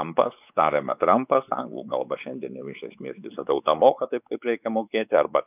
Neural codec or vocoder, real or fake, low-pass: codec, 16 kHz, 4 kbps, X-Codec, WavLM features, trained on Multilingual LibriSpeech; fake; 3.6 kHz